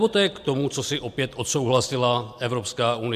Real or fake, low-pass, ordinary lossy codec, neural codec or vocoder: real; 14.4 kHz; MP3, 96 kbps; none